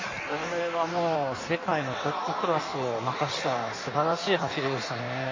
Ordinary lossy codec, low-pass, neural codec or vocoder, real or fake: MP3, 32 kbps; 7.2 kHz; codec, 16 kHz in and 24 kHz out, 1.1 kbps, FireRedTTS-2 codec; fake